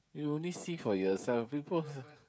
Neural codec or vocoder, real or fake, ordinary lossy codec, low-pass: codec, 16 kHz, 16 kbps, FreqCodec, smaller model; fake; none; none